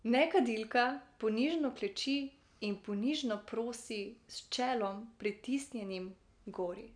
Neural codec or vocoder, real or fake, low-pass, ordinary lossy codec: none; real; 9.9 kHz; none